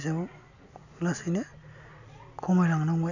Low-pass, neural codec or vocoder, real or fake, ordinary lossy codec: 7.2 kHz; none; real; none